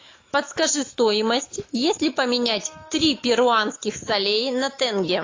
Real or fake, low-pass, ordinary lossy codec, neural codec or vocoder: fake; 7.2 kHz; AAC, 32 kbps; autoencoder, 48 kHz, 128 numbers a frame, DAC-VAE, trained on Japanese speech